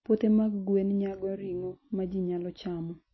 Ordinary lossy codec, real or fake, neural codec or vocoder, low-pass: MP3, 24 kbps; real; none; 7.2 kHz